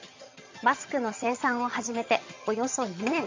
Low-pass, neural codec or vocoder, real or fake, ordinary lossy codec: 7.2 kHz; vocoder, 22.05 kHz, 80 mel bands, HiFi-GAN; fake; MP3, 48 kbps